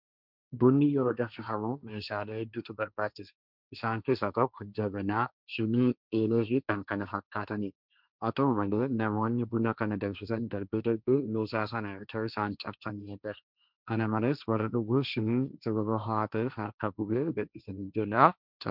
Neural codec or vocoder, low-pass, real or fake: codec, 16 kHz, 1.1 kbps, Voila-Tokenizer; 5.4 kHz; fake